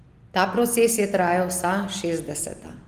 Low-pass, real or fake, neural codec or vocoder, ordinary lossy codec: 14.4 kHz; real; none; Opus, 24 kbps